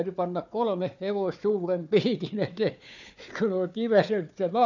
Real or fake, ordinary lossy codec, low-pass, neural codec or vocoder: fake; none; 7.2 kHz; codec, 16 kHz, 4 kbps, FunCodec, trained on Chinese and English, 50 frames a second